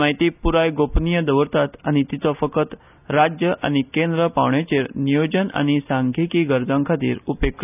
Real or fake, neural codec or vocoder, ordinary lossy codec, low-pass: real; none; none; 3.6 kHz